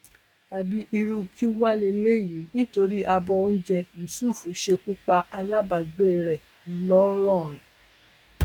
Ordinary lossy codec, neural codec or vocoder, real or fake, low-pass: MP3, 96 kbps; codec, 44.1 kHz, 2.6 kbps, DAC; fake; 19.8 kHz